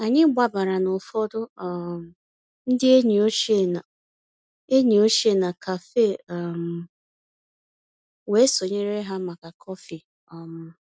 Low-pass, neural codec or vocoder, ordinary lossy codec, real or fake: none; none; none; real